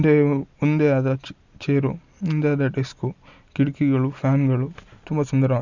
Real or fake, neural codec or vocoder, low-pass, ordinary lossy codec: real; none; 7.2 kHz; Opus, 64 kbps